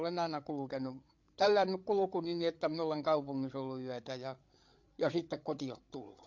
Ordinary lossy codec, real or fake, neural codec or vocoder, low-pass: MP3, 48 kbps; fake; codec, 16 kHz, 8 kbps, FreqCodec, larger model; 7.2 kHz